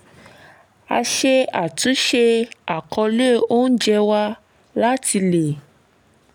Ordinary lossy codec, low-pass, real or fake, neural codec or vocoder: none; 19.8 kHz; real; none